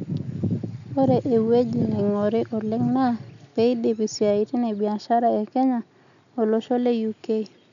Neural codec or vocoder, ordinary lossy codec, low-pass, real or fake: none; none; 7.2 kHz; real